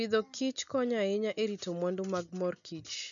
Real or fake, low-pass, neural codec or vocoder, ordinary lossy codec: real; 7.2 kHz; none; none